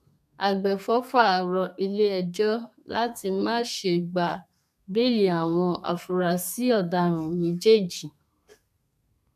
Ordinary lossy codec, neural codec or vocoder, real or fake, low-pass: none; codec, 32 kHz, 1.9 kbps, SNAC; fake; 14.4 kHz